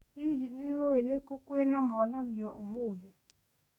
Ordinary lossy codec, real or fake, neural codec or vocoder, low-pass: none; fake; codec, 44.1 kHz, 2.6 kbps, DAC; 19.8 kHz